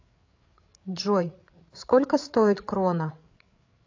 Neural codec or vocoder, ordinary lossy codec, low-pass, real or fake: codec, 16 kHz, 8 kbps, FreqCodec, larger model; AAC, 48 kbps; 7.2 kHz; fake